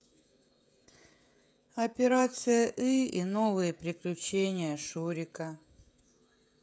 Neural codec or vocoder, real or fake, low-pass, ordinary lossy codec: codec, 16 kHz, 16 kbps, FreqCodec, smaller model; fake; none; none